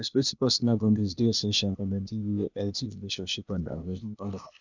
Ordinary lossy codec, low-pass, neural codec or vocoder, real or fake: none; 7.2 kHz; codec, 16 kHz, 0.8 kbps, ZipCodec; fake